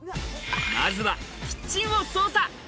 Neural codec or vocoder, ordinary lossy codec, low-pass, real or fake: none; none; none; real